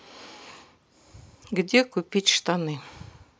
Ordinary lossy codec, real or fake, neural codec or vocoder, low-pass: none; real; none; none